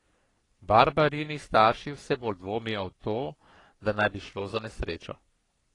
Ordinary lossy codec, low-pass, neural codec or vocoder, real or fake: AAC, 32 kbps; 10.8 kHz; codec, 44.1 kHz, 3.4 kbps, Pupu-Codec; fake